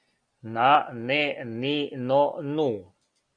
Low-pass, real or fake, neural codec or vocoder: 9.9 kHz; real; none